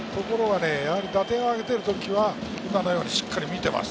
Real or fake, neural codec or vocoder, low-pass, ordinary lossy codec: real; none; none; none